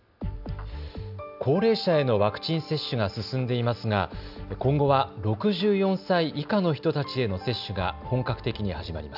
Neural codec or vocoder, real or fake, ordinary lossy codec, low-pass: none; real; none; 5.4 kHz